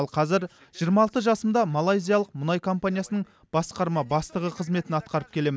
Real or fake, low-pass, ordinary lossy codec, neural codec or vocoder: real; none; none; none